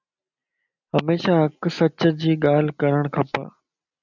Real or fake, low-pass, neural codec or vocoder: real; 7.2 kHz; none